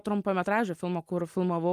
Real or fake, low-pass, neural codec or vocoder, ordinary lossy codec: real; 14.4 kHz; none; Opus, 32 kbps